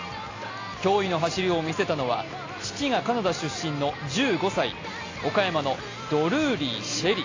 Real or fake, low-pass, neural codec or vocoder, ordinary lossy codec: real; 7.2 kHz; none; AAC, 32 kbps